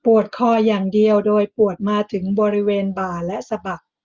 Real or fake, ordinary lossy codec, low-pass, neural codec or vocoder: real; Opus, 32 kbps; 7.2 kHz; none